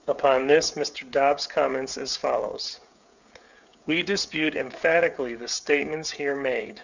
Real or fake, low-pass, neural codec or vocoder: fake; 7.2 kHz; codec, 16 kHz, 16 kbps, FreqCodec, smaller model